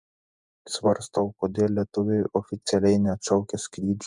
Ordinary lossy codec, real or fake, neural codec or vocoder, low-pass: MP3, 96 kbps; fake; vocoder, 48 kHz, 128 mel bands, Vocos; 10.8 kHz